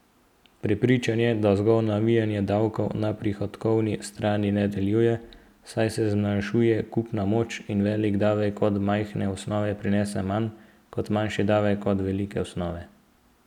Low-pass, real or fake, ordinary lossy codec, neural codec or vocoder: 19.8 kHz; real; none; none